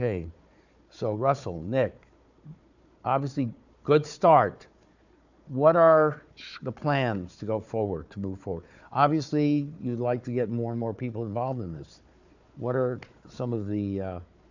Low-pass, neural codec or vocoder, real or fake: 7.2 kHz; codec, 16 kHz, 4 kbps, FunCodec, trained on Chinese and English, 50 frames a second; fake